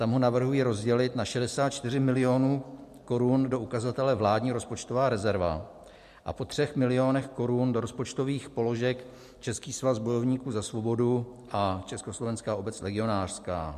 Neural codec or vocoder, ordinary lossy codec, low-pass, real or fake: none; MP3, 64 kbps; 14.4 kHz; real